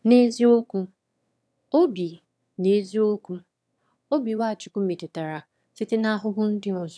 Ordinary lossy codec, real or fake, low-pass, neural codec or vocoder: none; fake; none; autoencoder, 22.05 kHz, a latent of 192 numbers a frame, VITS, trained on one speaker